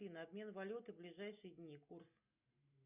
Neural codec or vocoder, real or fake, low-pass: none; real; 3.6 kHz